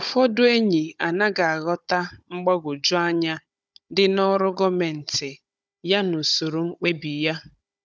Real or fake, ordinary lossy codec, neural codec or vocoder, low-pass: fake; none; codec, 16 kHz, 16 kbps, FunCodec, trained on Chinese and English, 50 frames a second; none